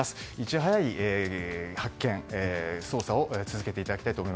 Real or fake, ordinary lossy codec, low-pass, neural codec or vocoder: real; none; none; none